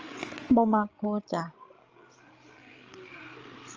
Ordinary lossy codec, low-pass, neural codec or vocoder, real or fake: Opus, 24 kbps; 7.2 kHz; codec, 16 kHz, 16 kbps, FreqCodec, larger model; fake